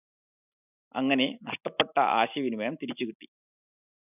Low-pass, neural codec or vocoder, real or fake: 3.6 kHz; none; real